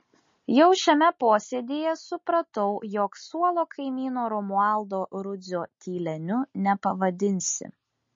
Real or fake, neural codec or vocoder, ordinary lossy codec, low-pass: real; none; MP3, 32 kbps; 7.2 kHz